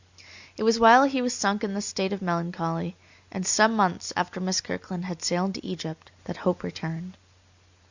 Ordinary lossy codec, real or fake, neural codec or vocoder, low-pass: Opus, 64 kbps; real; none; 7.2 kHz